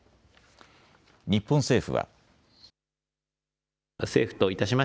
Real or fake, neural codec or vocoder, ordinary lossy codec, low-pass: real; none; none; none